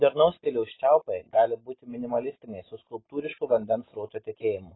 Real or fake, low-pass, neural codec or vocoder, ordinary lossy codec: real; 7.2 kHz; none; AAC, 16 kbps